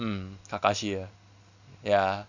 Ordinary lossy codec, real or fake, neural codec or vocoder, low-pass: none; real; none; 7.2 kHz